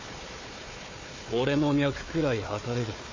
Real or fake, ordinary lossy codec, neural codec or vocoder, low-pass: fake; MP3, 32 kbps; codec, 16 kHz, 4 kbps, FunCodec, trained on Chinese and English, 50 frames a second; 7.2 kHz